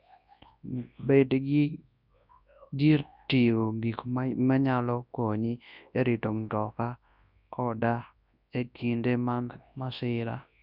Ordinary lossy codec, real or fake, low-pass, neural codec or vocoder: none; fake; 5.4 kHz; codec, 24 kHz, 0.9 kbps, WavTokenizer, large speech release